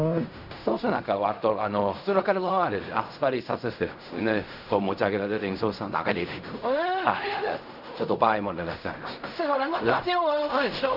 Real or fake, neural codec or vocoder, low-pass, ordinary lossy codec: fake; codec, 16 kHz in and 24 kHz out, 0.4 kbps, LongCat-Audio-Codec, fine tuned four codebook decoder; 5.4 kHz; none